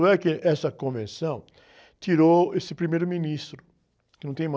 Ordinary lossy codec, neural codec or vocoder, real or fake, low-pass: none; none; real; none